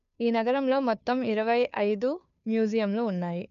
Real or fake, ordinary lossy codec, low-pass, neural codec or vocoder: fake; none; 7.2 kHz; codec, 16 kHz, 2 kbps, FunCodec, trained on Chinese and English, 25 frames a second